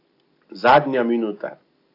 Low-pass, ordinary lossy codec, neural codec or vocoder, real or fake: 5.4 kHz; AAC, 32 kbps; none; real